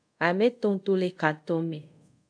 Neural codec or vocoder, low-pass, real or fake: codec, 24 kHz, 0.5 kbps, DualCodec; 9.9 kHz; fake